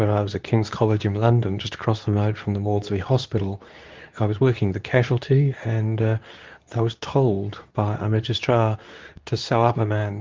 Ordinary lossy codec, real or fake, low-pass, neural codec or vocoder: Opus, 32 kbps; fake; 7.2 kHz; codec, 24 kHz, 0.9 kbps, WavTokenizer, medium speech release version 2